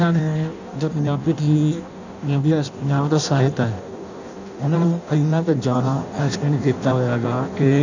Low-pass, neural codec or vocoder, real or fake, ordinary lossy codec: 7.2 kHz; codec, 16 kHz in and 24 kHz out, 0.6 kbps, FireRedTTS-2 codec; fake; none